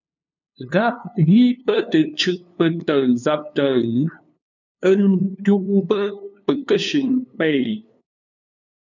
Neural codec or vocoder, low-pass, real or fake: codec, 16 kHz, 2 kbps, FunCodec, trained on LibriTTS, 25 frames a second; 7.2 kHz; fake